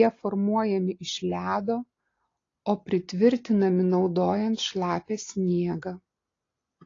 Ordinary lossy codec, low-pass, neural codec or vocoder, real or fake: AAC, 32 kbps; 7.2 kHz; none; real